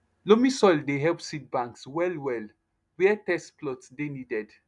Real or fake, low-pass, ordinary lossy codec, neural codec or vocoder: real; 10.8 kHz; none; none